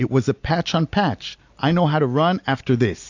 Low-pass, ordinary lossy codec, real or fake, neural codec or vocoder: 7.2 kHz; AAC, 48 kbps; real; none